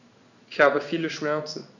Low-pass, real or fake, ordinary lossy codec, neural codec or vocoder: 7.2 kHz; fake; none; codec, 16 kHz in and 24 kHz out, 1 kbps, XY-Tokenizer